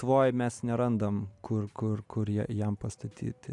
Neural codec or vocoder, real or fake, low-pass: none; real; 10.8 kHz